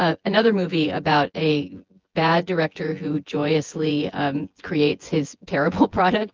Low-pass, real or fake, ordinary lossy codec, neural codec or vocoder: 7.2 kHz; fake; Opus, 32 kbps; vocoder, 24 kHz, 100 mel bands, Vocos